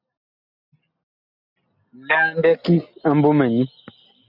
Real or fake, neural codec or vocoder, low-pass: real; none; 5.4 kHz